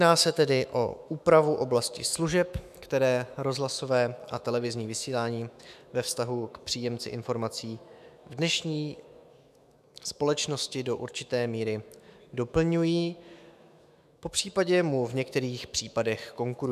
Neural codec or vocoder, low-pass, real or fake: autoencoder, 48 kHz, 128 numbers a frame, DAC-VAE, trained on Japanese speech; 14.4 kHz; fake